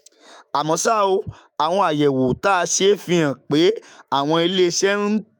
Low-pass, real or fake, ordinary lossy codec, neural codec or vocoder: 19.8 kHz; fake; none; autoencoder, 48 kHz, 128 numbers a frame, DAC-VAE, trained on Japanese speech